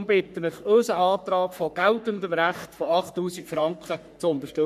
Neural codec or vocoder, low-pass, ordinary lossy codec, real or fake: codec, 44.1 kHz, 3.4 kbps, Pupu-Codec; 14.4 kHz; none; fake